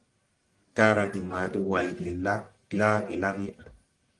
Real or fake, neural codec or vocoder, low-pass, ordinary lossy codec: fake; codec, 44.1 kHz, 1.7 kbps, Pupu-Codec; 10.8 kHz; Opus, 24 kbps